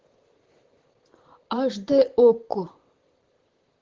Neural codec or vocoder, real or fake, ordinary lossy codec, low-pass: vocoder, 44.1 kHz, 128 mel bands, Pupu-Vocoder; fake; Opus, 16 kbps; 7.2 kHz